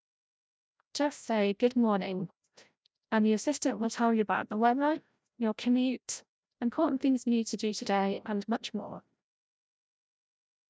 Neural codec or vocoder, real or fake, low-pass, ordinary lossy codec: codec, 16 kHz, 0.5 kbps, FreqCodec, larger model; fake; none; none